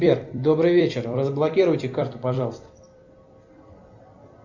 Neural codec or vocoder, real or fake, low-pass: vocoder, 44.1 kHz, 128 mel bands every 256 samples, BigVGAN v2; fake; 7.2 kHz